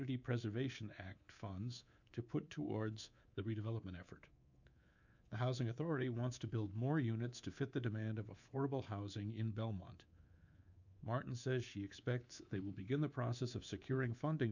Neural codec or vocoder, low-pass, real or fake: codec, 24 kHz, 3.1 kbps, DualCodec; 7.2 kHz; fake